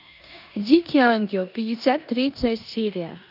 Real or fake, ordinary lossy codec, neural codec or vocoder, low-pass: fake; none; codec, 16 kHz in and 24 kHz out, 0.9 kbps, LongCat-Audio-Codec, four codebook decoder; 5.4 kHz